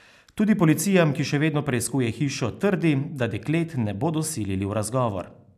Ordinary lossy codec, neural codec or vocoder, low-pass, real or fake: none; none; 14.4 kHz; real